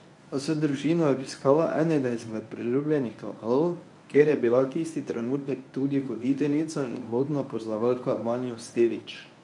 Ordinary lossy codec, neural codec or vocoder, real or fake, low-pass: none; codec, 24 kHz, 0.9 kbps, WavTokenizer, medium speech release version 1; fake; 10.8 kHz